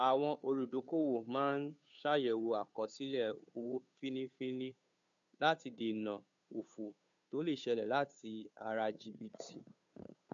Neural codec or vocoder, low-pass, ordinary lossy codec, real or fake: codec, 16 kHz, 2 kbps, FunCodec, trained on Chinese and English, 25 frames a second; 7.2 kHz; MP3, 64 kbps; fake